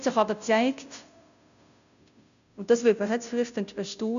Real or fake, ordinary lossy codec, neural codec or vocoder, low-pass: fake; none; codec, 16 kHz, 0.5 kbps, FunCodec, trained on Chinese and English, 25 frames a second; 7.2 kHz